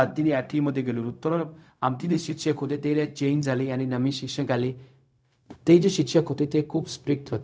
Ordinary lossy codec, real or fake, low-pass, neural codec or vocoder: none; fake; none; codec, 16 kHz, 0.4 kbps, LongCat-Audio-Codec